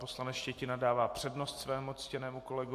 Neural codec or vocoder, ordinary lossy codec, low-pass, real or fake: none; AAC, 64 kbps; 14.4 kHz; real